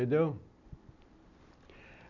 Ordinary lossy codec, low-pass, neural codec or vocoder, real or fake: none; 7.2 kHz; none; real